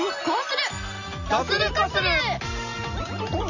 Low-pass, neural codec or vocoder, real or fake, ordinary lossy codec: 7.2 kHz; none; real; none